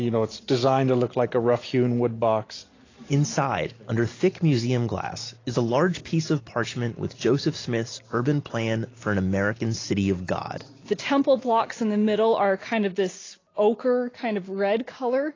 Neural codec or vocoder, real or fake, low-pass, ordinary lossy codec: none; real; 7.2 kHz; AAC, 32 kbps